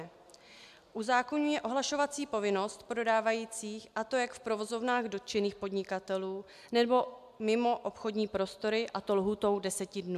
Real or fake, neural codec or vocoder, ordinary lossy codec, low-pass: real; none; AAC, 96 kbps; 14.4 kHz